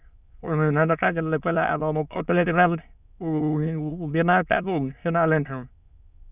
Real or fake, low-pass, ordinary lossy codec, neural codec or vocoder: fake; 3.6 kHz; none; autoencoder, 22.05 kHz, a latent of 192 numbers a frame, VITS, trained on many speakers